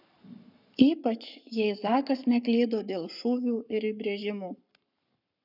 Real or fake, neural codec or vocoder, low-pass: fake; codec, 44.1 kHz, 7.8 kbps, Pupu-Codec; 5.4 kHz